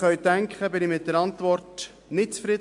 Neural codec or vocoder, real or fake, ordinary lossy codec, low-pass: none; real; MP3, 64 kbps; 10.8 kHz